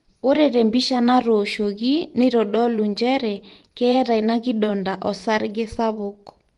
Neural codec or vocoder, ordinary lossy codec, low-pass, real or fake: vocoder, 24 kHz, 100 mel bands, Vocos; Opus, 24 kbps; 10.8 kHz; fake